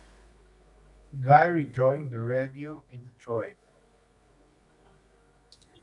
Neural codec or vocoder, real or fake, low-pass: codec, 24 kHz, 0.9 kbps, WavTokenizer, medium music audio release; fake; 10.8 kHz